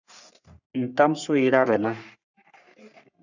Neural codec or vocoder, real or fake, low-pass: codec, 44.1 kHz, 3.4 kbps, Pupu-Codec; fake; 7.2 kHz